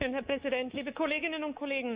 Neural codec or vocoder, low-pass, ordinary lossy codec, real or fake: codec, 24 kHz, 3.1 kbps, DualCodec; 3.6 kHz; Opus, 64 kbps; fake